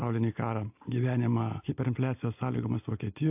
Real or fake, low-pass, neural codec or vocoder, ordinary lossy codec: real; 3.6 kHz; none; AAC, 32 kbps